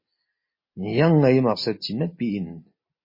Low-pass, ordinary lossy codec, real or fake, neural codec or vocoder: 5.4 kHz; MP3, 24 kbps; real; none